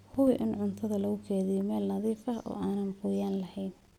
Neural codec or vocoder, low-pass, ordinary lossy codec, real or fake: none; 19.8 kHz; none; real